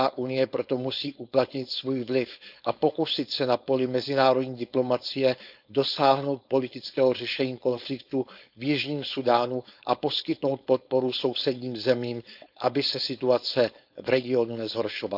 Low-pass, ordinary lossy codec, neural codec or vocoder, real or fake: 5.4 kHz; none; codec, 16 kHz, 4.8 kbps, FACodec; fake